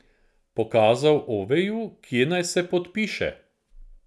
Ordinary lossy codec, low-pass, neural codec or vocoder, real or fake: none; none; none; real